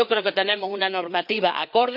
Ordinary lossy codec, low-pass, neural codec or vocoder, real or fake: none; 5.4 kHz; codec, 16 kHz, 4 kbps, FreqCodec, larger model; fake